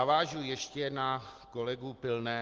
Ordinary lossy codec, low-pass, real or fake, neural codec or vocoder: Opus, 16 kbps; 7.2 kHz; real; none